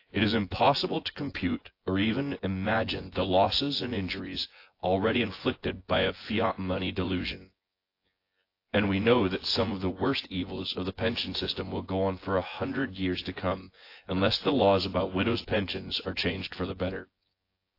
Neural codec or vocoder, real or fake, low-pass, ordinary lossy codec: vocoder, 24 kHz, 100 mel bands, Vocos; fake; 5.4 kHz; AAC, 32 kbps